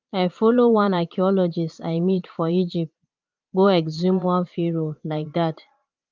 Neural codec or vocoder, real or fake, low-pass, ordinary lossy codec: vocoder, 22.05 kHz, 80 mel bands, Vocos; fake; 7.2 kHz; Opus, 32 kbps